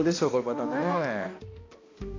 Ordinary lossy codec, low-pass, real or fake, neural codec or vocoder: AAC, 32 kbps; 7.2 kHz; fake; codec, 16 kHz, 1 kbps, X-Codec, HuBERT features, trained on balanced general audio